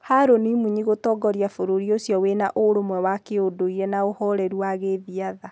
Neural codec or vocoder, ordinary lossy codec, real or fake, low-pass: none; none; real; none